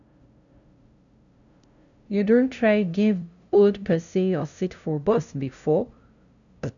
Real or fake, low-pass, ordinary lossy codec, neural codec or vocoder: fake; 7.2 kHz; none; codec, 16 kHz, 0.5 kbps, FunCodec, trained on LibriTTS, 25 frames a second